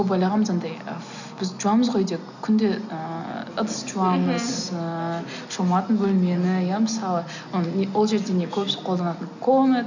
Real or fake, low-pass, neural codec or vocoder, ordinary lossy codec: real; 7.2 kHz; none; none